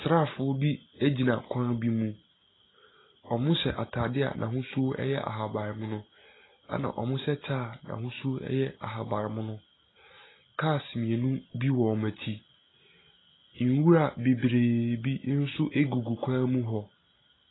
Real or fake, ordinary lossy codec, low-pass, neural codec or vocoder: real; AAC, 16 kbps; 7.2 kHz; none